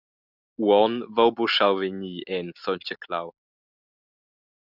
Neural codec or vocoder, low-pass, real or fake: none; 5.4 kHz; real